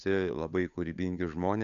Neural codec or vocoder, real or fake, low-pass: codec, 16 kHz, 8 kbps, FunCodec, trained on Chinese and English, 25 frames a second; fake; 7.2 kHz